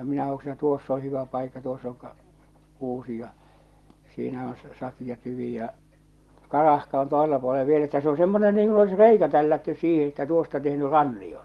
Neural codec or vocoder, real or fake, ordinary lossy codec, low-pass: none; real; Opus, 24 kbps; 19.8 kHz